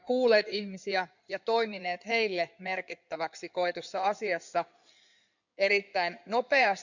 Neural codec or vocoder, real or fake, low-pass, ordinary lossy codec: codec, 16 kHz in and 24 kHz out, 2.2 kbps, FireRedTTS-2 codec; fake; 7.2 kHz; none